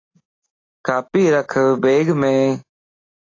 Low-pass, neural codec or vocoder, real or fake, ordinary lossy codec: 7.2 kHz; none; real; AAC, 32 kbps